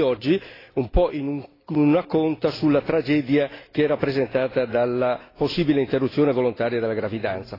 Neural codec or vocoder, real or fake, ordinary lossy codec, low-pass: none; real; AAC, 24 kbps; 5.4 kHz